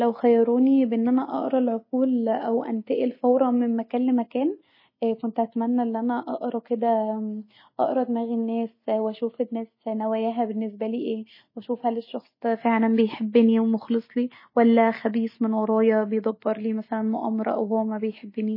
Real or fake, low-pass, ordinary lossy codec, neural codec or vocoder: real; 5.4 kHz; MP3, 24 kbps; none